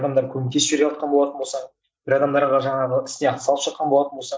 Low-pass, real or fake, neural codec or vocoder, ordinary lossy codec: none; real; none; none